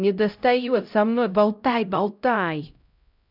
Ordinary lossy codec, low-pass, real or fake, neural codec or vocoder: none; 5.4 kHz; fake; codec, 16 kHz, 0.5 kbps, X-Codec, HuBERT features, trained on LibriSpeech